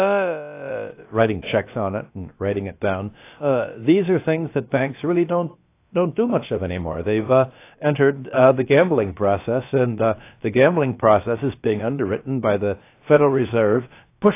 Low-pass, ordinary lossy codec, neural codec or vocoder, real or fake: 3.6 kHz; AAC, 24 kbps; codec, 16 kHz, about 1 kbps, DyCAST, with the encoder's durations; fake